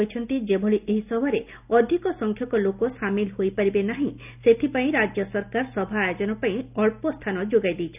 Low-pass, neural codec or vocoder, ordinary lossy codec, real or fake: 3.6 kHz; none; none; real